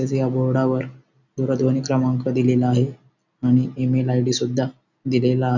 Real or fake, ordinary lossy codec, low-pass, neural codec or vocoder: real; none; 7.2 kHz; none